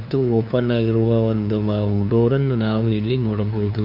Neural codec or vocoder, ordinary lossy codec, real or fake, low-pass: codec, 16 kHz, 2 kbps, FunCodec, trained on LibriTTS, 25 frames a second; none; fake; 5.4 kHz